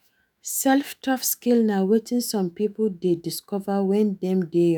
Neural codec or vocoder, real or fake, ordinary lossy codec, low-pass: autoencoder, 48 kHz, 128 numbers a frame, DAC-VAE, trained on Japanese speech; fake; none; none